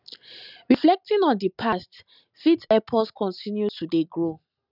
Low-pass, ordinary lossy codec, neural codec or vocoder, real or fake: 5.4 kHz; none; none; real